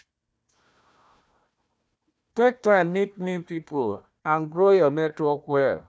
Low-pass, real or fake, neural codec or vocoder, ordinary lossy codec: none; fake; codec, 16 kHz, 1 kbps, FunCodec, trained on Chinese and English, 50 frames a second; none